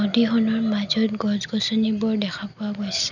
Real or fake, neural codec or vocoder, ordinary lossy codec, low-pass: real; none; none; 7.2 kHz